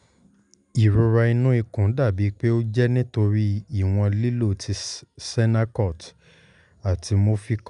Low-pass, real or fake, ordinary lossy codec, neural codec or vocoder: 10.8 kHz; real; none; none